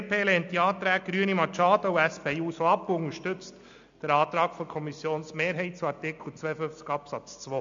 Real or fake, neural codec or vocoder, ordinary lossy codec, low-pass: real; none; AAC, 64 kbps; 7.2 kHz